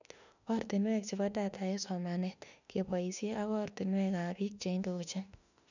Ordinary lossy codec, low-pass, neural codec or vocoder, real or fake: none; 7.2 kHz; autoencoder, 48 kHz, 32 numbers a frame, DAC-VAE, trained on Japanese speech; fake